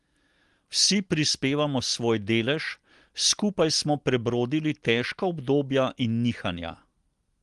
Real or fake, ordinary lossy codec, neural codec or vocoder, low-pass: real; Opus, 24 kbps; none; 10.8 kHz